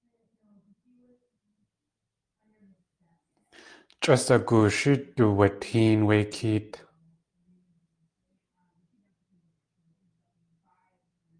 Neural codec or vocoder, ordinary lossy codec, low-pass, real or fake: none; Opus, 32 kbps; 9.9 kHz; real